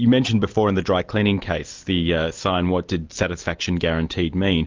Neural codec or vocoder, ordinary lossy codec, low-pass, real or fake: none; Opus, 32 kbps; 7.2 kHz; real